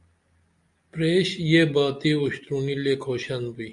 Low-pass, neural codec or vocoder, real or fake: 10.8 kHz; none; real